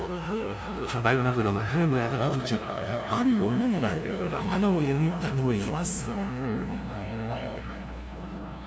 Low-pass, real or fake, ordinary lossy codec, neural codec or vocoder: none; fake; none; codec, 16 kHz, 0.5 kbps, FunCodec, trained on LibriTTS, 25 frames a second